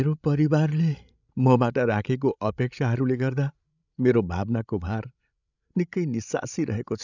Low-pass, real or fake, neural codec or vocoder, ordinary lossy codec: 7.2 kHz; fake; codec, 16 kHz, 16 kbps, FreqCodec, larger model; none